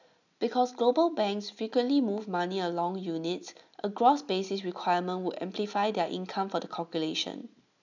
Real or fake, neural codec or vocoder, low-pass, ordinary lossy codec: real; none; 7.2 kHz; none